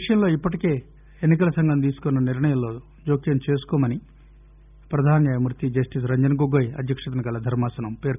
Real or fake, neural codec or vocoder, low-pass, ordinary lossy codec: real; none; 3.6 kHz; none